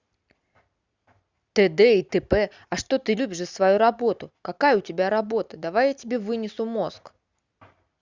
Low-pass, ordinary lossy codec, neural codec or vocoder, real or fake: 7.2 kHz; Opus, 64 kbps; none; real